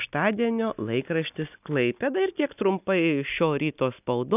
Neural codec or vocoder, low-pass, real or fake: none; 3.6 kHz; real